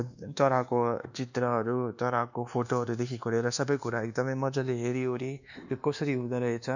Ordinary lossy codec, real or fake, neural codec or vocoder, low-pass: none; fake; codec, 24 kHz, 1.2 kbps, DualCodec; 7.2 kHz